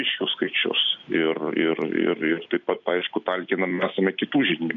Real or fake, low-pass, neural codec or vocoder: real; 7.2 kHz; none